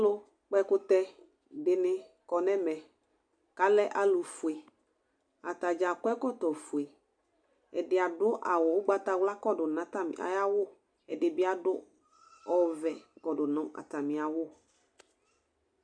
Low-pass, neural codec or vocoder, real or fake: 9.9 kHz; none; real